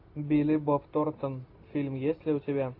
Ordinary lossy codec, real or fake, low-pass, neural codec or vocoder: AAC, 32 kbps; real; 5.4 kHz; none